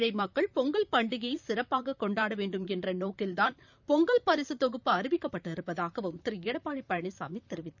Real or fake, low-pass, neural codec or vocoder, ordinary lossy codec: fake; 7.2 kHz; vocoder, 44.1 kHz, 128 mel bands, Pupu-Vocoder; none